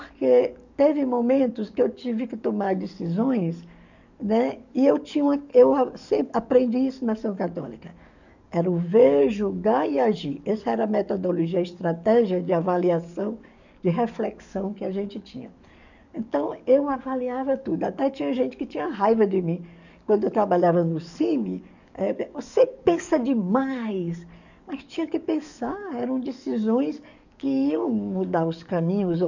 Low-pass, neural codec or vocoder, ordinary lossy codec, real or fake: 7.2 kHz; codec, 44.1 kHz, 7.8 kbps, DAC; none; fake